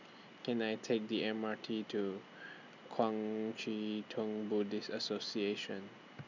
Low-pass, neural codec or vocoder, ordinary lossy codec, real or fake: 7.2 kHz; none; none; real